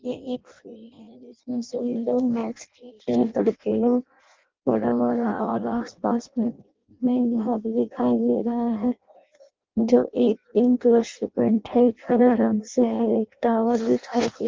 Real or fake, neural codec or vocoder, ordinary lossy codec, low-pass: fake; codec, 16 kHz in and 24 kHz out, 0.6 kbps, FireRedTTS-2 codec; Opus, 24 kbps; 7.2 kHz